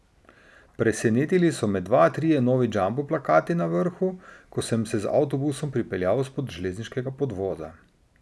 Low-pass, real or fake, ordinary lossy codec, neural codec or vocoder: none; real; none; none